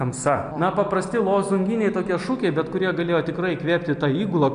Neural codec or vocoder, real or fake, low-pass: none; real; 9.9 kHz